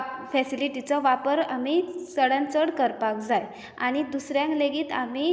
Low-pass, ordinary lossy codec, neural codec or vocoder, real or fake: none; none; none; real